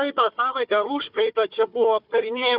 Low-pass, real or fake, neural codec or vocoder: 5.4 kHz; fake; codec, 16 kHz, 4 kbps, FunCodec, trained on Chinese and English, 50 frames a second